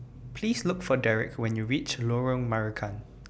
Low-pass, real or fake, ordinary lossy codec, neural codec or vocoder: none; real; none; none